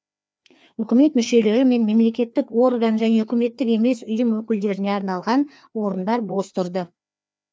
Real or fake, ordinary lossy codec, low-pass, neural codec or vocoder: fake; none; none; codec, 16 kHz, 2 kbps, FreqCodec, larger model